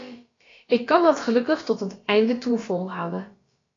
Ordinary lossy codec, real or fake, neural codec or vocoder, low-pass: AAC, 32 kbps; fake; codec, 16 kHz, about 1 kbps, DyCAST, with the encoder's durations; 7.2 kHz